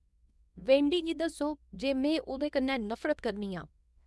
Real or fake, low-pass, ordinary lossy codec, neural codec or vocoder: fake; none; none; codec, 24 kHz, 0.9 kbps, WavTokenizer, small release